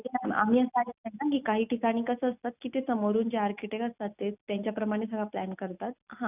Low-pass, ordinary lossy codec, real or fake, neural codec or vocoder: 3.6 kHz; none; real; none